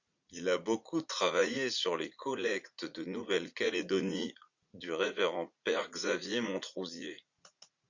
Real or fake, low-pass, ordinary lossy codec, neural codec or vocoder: fake; 7.2 kHz; Opus, 64 kbps; vocoder, 44.1 kHz, 80 mel bands, Vocos